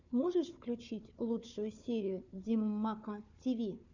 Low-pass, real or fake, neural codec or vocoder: 7.2 kHz; fake; codec, 16 kHz, 4 kbps, FunCodec, trained on Chinese and English, 50 frames a second